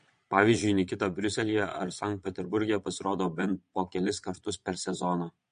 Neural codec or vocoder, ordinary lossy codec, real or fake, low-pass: vocoder, 22.05 kHz, 80 mel bands, Vocos; MP3, 48 kbps; fake; 9.9 kHz